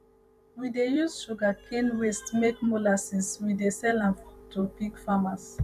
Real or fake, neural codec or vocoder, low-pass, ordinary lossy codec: fake; vocoder, 44.1 kHz, 128 mel bands every 512 samples, BigVGAN v2; 14.4 kHz; none